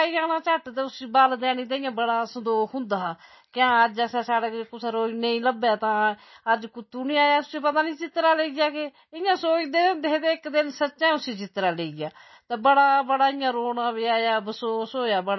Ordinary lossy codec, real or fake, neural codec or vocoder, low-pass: MP3, 24 kbps; real; none; 7.2 kHz